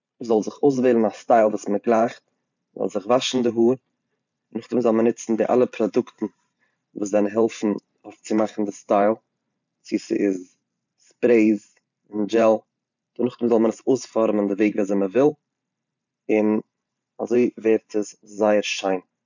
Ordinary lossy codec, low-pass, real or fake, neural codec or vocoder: none; 7.2 kHz; fake; vocoder, 44.1 kHz, 128 mel bands every 512 samples, BigVGAN v2